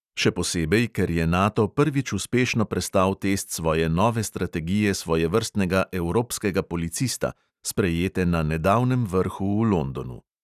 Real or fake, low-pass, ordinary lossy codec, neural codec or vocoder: real; 14.4 kHz; none; none